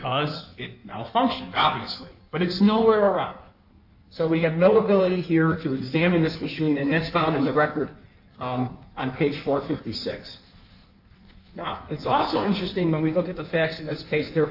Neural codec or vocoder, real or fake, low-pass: codec, 16 kHz in and 24 kHz out, 1.1 kbps, FireRedTTS-2 codec; fake; 5.4 kHz